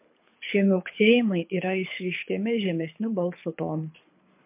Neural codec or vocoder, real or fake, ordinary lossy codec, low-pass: codec, 24 kHz, 6 kbps, HILCodec; fake; MP3, 32 kbps; 3.6 kHz